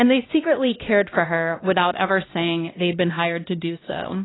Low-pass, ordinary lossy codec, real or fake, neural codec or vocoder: 7.2 kHz; AAC, 16 kbps; fake; codec, 16 kHz, 1 kbps, X-Codec, HuBERT features, trained on LibriSpeech